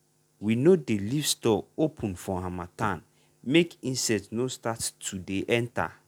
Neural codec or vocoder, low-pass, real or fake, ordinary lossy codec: none; 19.8 kHz; real; none